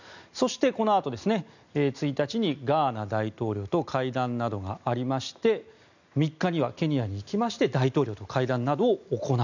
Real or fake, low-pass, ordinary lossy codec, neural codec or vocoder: real; 7.2 kHz; none; none